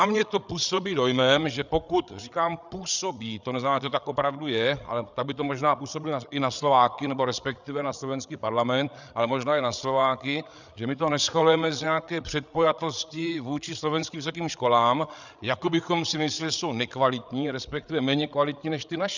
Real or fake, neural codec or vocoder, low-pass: fake; codec, 16 kHz, 8 kbps, FreqCodec, larger model; 7.2 kHz